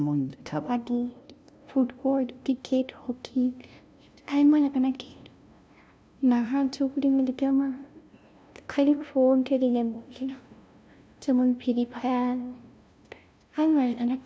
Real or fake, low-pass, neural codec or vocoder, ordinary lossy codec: fake; none; codec, 16 kHz, 0.5 kbps, FunCodec, trained on LibriTTS, 25 frames a second; none